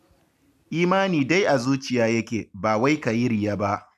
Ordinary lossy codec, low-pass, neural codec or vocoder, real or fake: none; 14.4 kHz; none; real